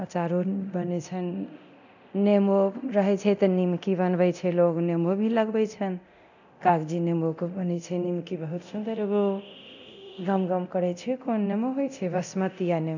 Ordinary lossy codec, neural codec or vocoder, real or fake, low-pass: none; codec, 24 kHz, 0.9 kbps, DualCodec; fake; 7.2 kHz